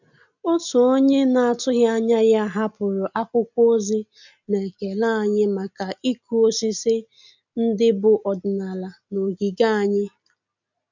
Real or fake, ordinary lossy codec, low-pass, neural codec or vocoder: real; none; 7.2 kHz; none